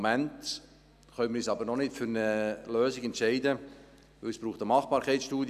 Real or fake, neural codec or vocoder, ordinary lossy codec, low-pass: real; none; none; 14.4 kHz